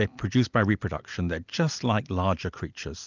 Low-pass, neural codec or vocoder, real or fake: 7.2 kHz; none; real